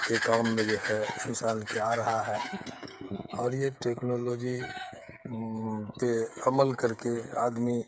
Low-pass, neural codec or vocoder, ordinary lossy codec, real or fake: none; codec, 16 kHz, 8 kbps, FreqCodec, smaller model; none; fake